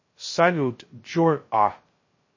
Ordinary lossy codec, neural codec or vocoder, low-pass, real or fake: MP3, 32 kbps; codec, 16 kHz, 0.2 kbps, FocalCodec; 7.2 kHz; fake